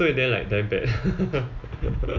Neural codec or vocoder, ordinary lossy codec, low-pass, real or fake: none; none; 7.2 kHz; real